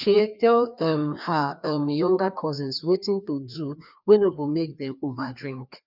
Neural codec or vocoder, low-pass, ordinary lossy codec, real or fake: codec, 16 kHz, 2 kbps, FreqCodec, larger model; 5.4 kHz; none; fake